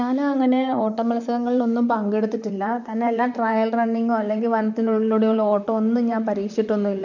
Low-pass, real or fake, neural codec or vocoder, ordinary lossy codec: 7.2 kHz; fake; vocoder, 44.1 kHz, 128 mel bands, Pupu-Vocoder; none